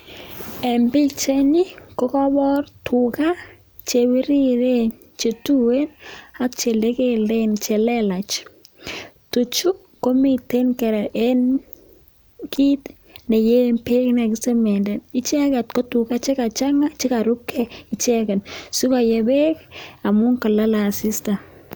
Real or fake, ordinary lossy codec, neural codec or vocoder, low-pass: real; none; none; none